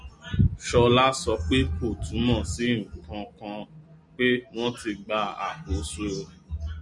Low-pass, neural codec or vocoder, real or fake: 10.8 kHz; none; real